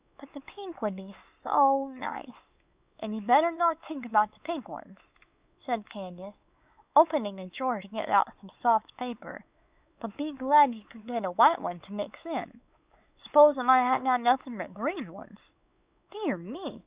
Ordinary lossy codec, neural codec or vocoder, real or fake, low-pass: Opus, 64 kbps; codec, 16 kHz, 4 kbps, X-Codec, WavLM features, trained on Multilingual LibriSpeech; fake; 3.6 kHz